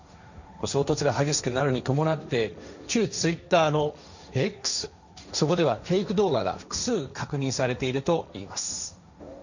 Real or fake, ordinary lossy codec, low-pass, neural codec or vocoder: fake; none; 7.2 kHz; codec, 16 kHz, 1.1 kbps, Voila-Tokenizer